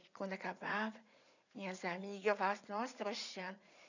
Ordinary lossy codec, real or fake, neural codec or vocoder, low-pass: none; fake; vocoder, 44.1 kHz, 80 mel bands, Vocos; 7.2 kHz